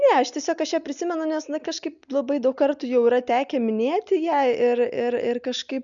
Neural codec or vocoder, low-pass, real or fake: none; 7.2 kHz; real